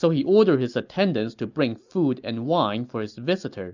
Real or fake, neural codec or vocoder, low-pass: real; none; 7.2 kHz